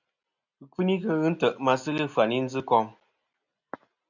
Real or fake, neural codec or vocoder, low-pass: real; none; 7.2 kHz